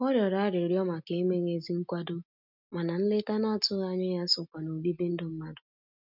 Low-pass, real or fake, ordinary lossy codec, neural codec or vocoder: 5.4 kHz; real; none; none